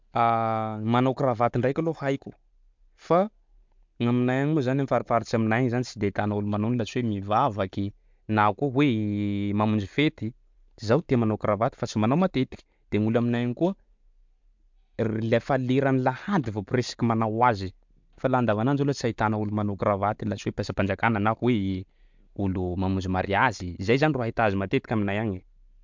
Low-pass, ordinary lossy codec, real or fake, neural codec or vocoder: 7.2 kHz; MP3, 64 kbps; real; none